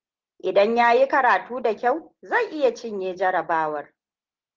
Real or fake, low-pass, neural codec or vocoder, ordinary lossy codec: real; 7.2 kHz; none; Opus, 16 kbps